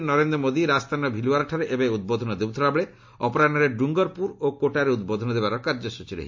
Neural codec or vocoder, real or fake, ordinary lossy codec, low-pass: none; real; MP3, 48 kbps; 7.2 kHz